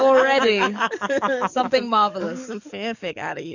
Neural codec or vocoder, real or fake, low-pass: codec, 44.1 kHz, 7.8 kbps, DAC; fake; 7.2 kHz